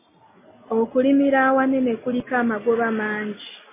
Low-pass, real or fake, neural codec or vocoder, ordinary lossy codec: 3.6 kHz; real; none; MP3, 16 kbps